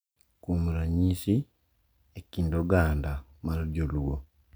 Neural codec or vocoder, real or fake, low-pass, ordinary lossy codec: none; real; none; none